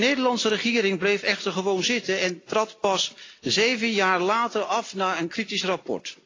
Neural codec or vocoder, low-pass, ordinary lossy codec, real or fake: none; 7.2 kHz; AAC, 32 kbps; real